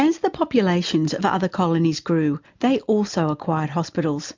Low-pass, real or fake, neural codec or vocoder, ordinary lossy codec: 7.2 kHz; real; none; MP3, 64 kbps